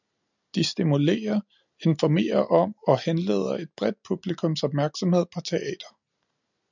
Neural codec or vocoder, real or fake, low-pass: none; real; 7.2 kHz